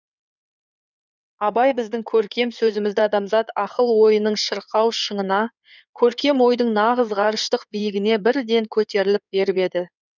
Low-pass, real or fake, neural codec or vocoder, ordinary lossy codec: 7.2 kHz; fake; codec, 16 kHz in and 24 kHz out, 2.2 kbps, FireRedTTS-2 codec; none